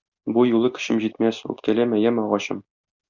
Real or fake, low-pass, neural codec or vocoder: real; 7.2 kHz; none